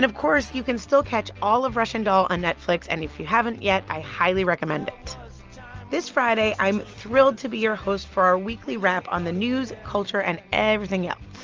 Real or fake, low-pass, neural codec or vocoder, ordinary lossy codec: real; 7.2 kHz; none; Opus, 24 kbps